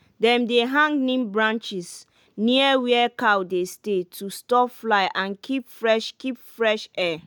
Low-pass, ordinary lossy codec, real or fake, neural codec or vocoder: none; none; real; none